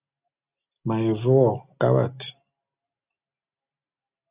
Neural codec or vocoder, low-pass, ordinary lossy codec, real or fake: none; 3.6 kHz; Opus, 64 kbps; real